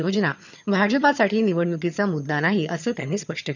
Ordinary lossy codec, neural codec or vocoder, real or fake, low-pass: none; vocoder, 22.05 kHz, 80 mel bands, HiFi-GAN; fake; 7.2 kHz